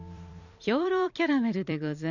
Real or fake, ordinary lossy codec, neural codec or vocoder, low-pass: fake; none; vocoder, 44.1 kHz, 128 mel bands every 256 samples, BigVGAN v2; 7.2 kHz